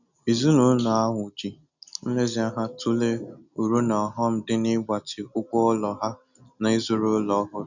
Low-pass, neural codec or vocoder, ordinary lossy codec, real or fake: 7.2 kHz; none; none; real